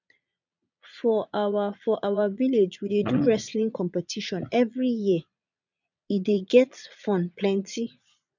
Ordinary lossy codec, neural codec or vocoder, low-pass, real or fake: none; vocoder, 22.05 kHz, 80 mel bands, Vocos; 7.2 kHz; fake